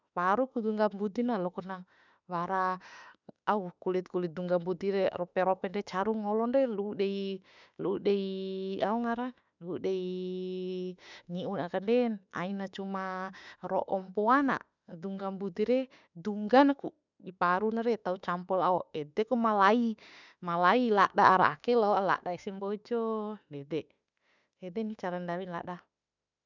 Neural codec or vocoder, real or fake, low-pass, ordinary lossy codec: autoencoder, 48 kHz, 32 numbers a frame, DAC-VAE, trained on Japanese speech; fake; 7.2 kHz; none